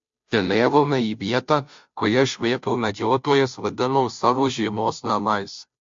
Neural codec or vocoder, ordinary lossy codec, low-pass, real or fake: codec, 16 kHz, 0.5 kbps, FunCodec, trained on Chinese and English, 25 frames a second; MP3, 48 kbps; 7.2 kHz; fake